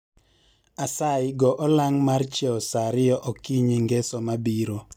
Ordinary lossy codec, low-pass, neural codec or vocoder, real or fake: none; 19.8 kHz; none; real